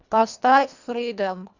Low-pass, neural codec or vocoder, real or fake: 7.2 kHz; codec, 24 kHz, 1.5 kbps, HILCodec; fake